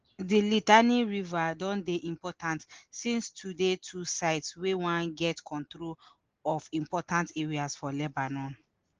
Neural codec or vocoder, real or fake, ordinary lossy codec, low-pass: none; real; Opus, 16 kbps; 7.2 kHz